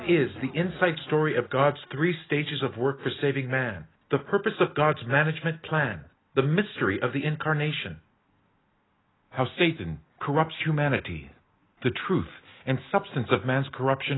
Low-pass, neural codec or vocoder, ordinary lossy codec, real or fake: 7.2 kHz; none; AAC, 16 kbps; real